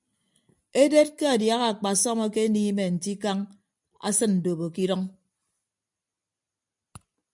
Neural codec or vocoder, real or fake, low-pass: none; real; 10.8 kHz